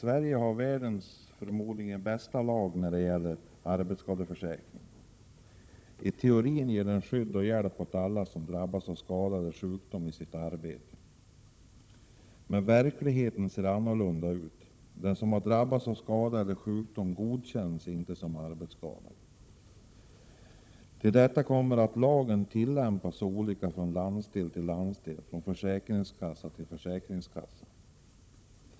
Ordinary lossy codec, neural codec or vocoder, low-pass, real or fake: none; codec, 16 kHz, 16 kbps, FunCodec, trained on Chinese and English, 50 frames a second; none; fake